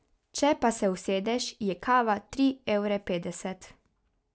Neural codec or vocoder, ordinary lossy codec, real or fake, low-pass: none; none; real; none